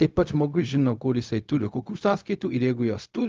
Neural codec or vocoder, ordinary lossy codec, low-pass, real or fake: codec, 16 kHz, 0.4 kbps, LongCat-Audio-Codec; Opus, 32 kbps; 7.2 kHz; fake